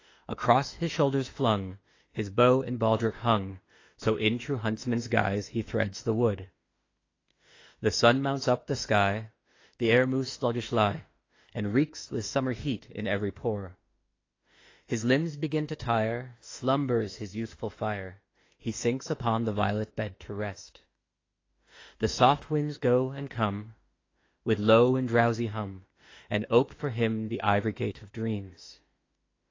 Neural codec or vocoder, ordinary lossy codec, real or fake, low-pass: autoencoder, 48 kHz, 32 numbers a frame, DAC-VAE, trained on Japanese speech; AAC, 32 kbps; fake; 7.2 kHz